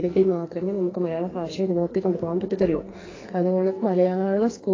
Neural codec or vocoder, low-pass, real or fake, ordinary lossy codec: codec, 44.1 kHz, 2.6 kbps, SNAC; 7.2 kHz; fake; AAC, 32 kbps